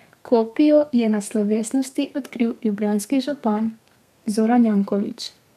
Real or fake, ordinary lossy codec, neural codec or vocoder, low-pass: fake; none; codec, 32 kHz, 1.9 kbps, SNAC; 14.4 kHz